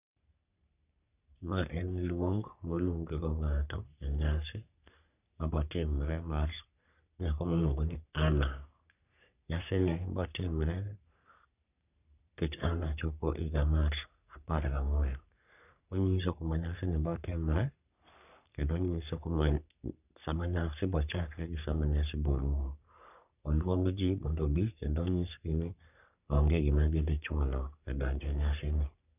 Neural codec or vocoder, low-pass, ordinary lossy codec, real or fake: codec, 44.1 kHz, 3.4 kbps, Pupu-Codec; 3.6 kHz; none; fake